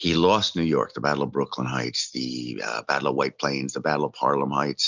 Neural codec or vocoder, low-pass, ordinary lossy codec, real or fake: none; 7.2 kHz; Opus, 64 kbps; real